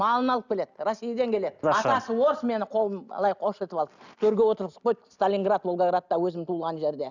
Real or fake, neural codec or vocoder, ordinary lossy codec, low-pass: real; none; none; 7.2 kHz